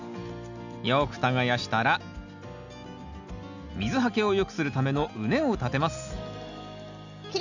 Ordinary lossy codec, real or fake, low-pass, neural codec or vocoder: none; real; 7.2 kHz; none